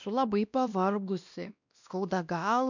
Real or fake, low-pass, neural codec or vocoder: fake; 7.2 kHz; codec, 16 kHz, 1 kbps, X-Codec, WavLM features, trained on Multilingual LibriSpeech